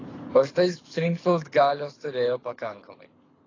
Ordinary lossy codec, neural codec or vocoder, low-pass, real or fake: AAC, 32 kbps; codec, 24 kHz, 6 kbps, HILCodec; 7.2 kHz; fake